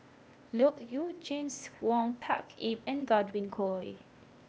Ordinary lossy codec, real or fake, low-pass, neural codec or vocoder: none; fake; none; codec, 16 kHz, 0.8 kbps, ZipCodec